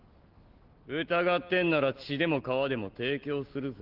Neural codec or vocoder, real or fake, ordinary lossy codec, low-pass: none; real; Opus, 16 kbps; 5.4 kHz